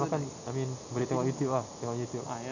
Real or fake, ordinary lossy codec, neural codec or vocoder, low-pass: real; none; none; 7.2 kHz